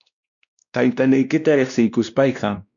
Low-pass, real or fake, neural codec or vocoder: 7.2 kHz; fake; codec, 16 kHz, 1 kbps, X-Codec, WavLM features, trained on Multilingual LibriSpeech